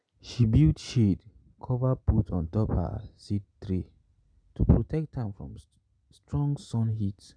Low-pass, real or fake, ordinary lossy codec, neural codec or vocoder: 9.9 kHz; real; none; none